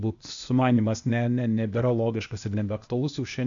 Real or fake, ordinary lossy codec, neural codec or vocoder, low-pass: fake; AAC, 64 kbps; codec, 16 kHz, 0.8 kbps, ZipCodec; 7.2 kHz